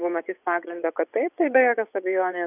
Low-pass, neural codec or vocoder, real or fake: 3.6 kHz; vocoder, 24 kHz, 100 mel bands, Vocos; fake